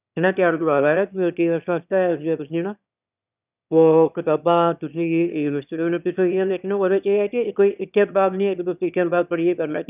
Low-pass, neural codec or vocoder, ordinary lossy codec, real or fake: 3.6 kHz; autoencoder, 22.05 kHz, a latent of 192 numbers a frame, VITS, trained on one speaker; none; fake